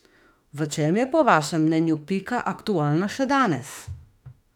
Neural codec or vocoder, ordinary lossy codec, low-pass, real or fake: autoencoder, 48 kHz, 32 numbers a frame, DAC-VAE, trained on Japanese speech; none; 19.8 kHz; fake